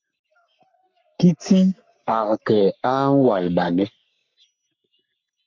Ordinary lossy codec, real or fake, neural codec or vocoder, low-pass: MP3, 64 kbps; fake; codec, 44.1 kHz, 3.4 kbps, Pupu-Codec; 7.2 kHz